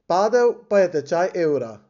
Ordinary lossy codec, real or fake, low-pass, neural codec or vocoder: none; real; 7.2 kHz; none